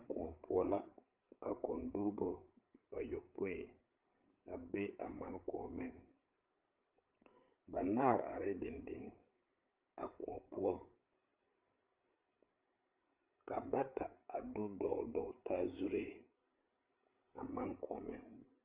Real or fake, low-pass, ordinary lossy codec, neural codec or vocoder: fake; 3.6 kHz; Opus, 32 kbps; codec, 16 kHz, 8 kbps, FreqCodec, larger model